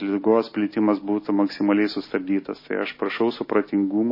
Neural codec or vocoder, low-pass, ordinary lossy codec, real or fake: none; 5.4 kHz; MP3, 24 kbps; real